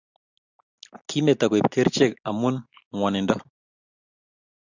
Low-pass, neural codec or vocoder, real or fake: 7.2 kHz; none; real